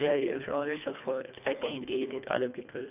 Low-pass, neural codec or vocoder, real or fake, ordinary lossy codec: 3.6 kHz; codec, 24 kHz, 1.5 kbps, HILCodec; fake; none